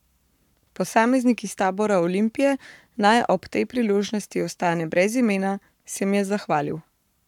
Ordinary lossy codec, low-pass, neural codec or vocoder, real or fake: none; 19.8 kHz; codec, 44.1 kHz, 7.8 kbps, Pupu-Codec; fake